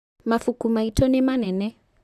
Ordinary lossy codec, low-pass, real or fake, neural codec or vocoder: none; 14.4 kHz; fake; vocoder, 44.1 kHz, 128 mel bands, Pupu-Vocoder